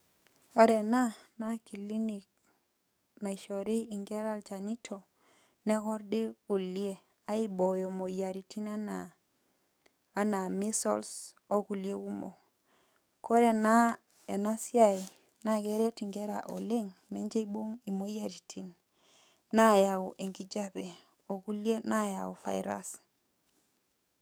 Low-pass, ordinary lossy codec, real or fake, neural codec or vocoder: none; none; fake; codec, 44.1 kHz, 7.8 kbps, DAC